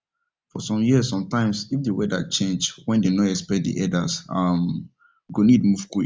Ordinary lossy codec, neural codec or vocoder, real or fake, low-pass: none; none; real; none